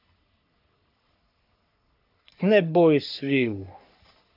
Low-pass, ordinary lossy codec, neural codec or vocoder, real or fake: 5.4 kHz; none; codec, 44.1 kHz, 3.4 kbps, Pupu-Codec; fake